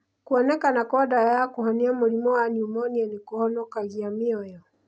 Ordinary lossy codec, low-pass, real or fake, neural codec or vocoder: none; none; real; none